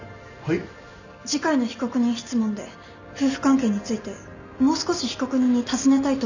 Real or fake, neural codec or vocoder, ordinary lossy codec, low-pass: real; none; none; 7.2 kHz